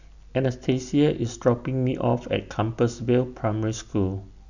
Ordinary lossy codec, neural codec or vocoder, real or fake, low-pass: none; none; real; 7.2 kHz